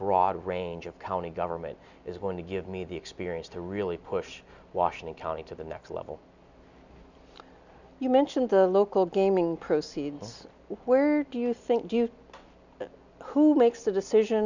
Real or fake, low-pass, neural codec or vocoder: real; 7.2 kHz; none